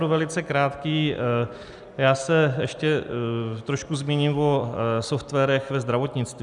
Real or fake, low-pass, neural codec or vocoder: real; 10.8 kHz; none